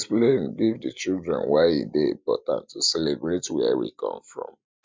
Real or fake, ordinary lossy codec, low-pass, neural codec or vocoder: real; none; none; none